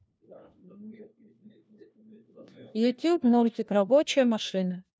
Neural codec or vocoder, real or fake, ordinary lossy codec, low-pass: codec, 16 kHz, 1 kbps, FunCodec, trained on LibriTTS, 50 frames a second; fake; none; none